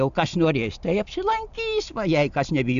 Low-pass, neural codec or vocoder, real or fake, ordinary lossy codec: 7.2 kHz; none; real; AAC, 96 kbps